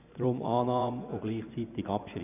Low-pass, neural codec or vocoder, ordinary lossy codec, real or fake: 3.6 kHz; vocoder, 24 kHz, 100 mel bands, Vocos; none; fake